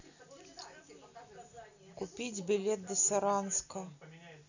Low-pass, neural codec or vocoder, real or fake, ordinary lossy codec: 7.2 kHz; none; real; none